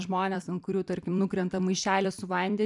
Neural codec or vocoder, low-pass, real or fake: vocoder, 44.1 kHz, 128 mel bands every 256 samples, BigVGAN v2; 10.8 kHz; fake